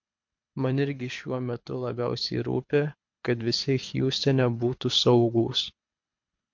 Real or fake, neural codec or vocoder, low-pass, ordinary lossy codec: fake; codec, 24 kHz, 6 kbps, HILCodec; 7.2 kHz; MP3, 48 kbps